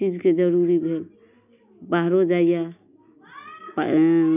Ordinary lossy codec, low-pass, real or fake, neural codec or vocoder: none; 3.6 kHz; real; none